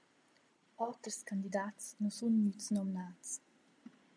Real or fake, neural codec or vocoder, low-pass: real; none; 9.9 kHz